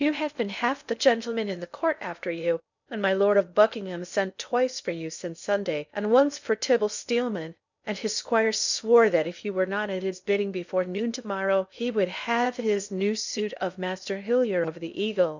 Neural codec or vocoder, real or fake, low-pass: codec, 16 kHz in and 24 kHz out, 0.6 kbps, FocalCodec, streaming, 4096 codes; fake; 7.2 kHz